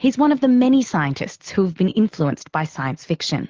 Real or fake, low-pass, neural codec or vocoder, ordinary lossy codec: real; 7.2 kHz; none; Opus, 16 kbps